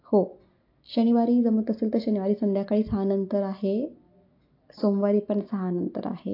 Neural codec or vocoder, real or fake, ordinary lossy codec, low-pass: none; real; AAC, 32 kbps; 5.4 kHz